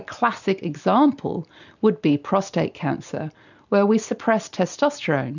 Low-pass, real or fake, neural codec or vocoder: 7.2 kHz; real; none